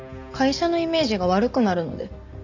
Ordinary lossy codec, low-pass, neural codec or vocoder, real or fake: none; 7.2 kHz; none; real